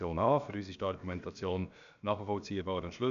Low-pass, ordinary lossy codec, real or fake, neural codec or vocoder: 7.2 kHz; none; fake; codec, 16 kHz, about 1 kbps, DyCAST, with the encoder's durations